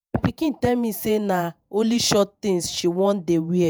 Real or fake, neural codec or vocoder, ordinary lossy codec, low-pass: fake; vocoder, 48 kHz, 128 mel bands, Vocos; none; none